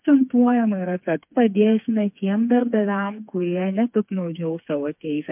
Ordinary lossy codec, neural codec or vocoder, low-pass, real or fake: MP3, 32 kbps; codec, 16 kHz, 4 kbps, FreqCodec, smaller model; 3.6 kHz; fake